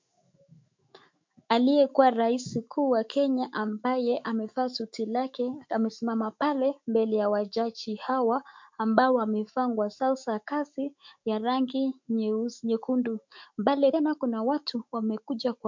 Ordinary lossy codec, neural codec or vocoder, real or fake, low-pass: MP3, 48 kbps; autoencoder, 48 kHz, 128 numbers a frame, DAC-VAE, trained on Japanese speech; fake; 7.2 kHz